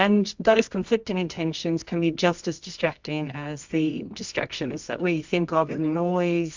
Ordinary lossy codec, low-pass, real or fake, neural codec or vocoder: MP3, 64 kbps; 7.2 kHz; fake; codec, 24 kHz, 0.9 kbps, WavTokenizer, medium music audio release